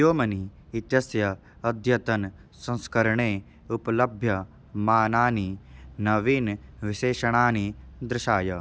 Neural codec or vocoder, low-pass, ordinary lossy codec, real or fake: none; none; none; real